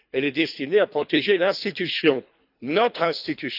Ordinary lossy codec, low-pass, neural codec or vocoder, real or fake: none; 5.4 kHz; codec, 24 kHz, 3 kbps, HILCodec; fake